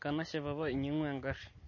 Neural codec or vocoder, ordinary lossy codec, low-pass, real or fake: none; MP3, 32 kbps; 7.2 kHz; real